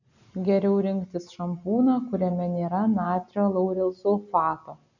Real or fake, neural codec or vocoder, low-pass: real; none; 7.2 kHz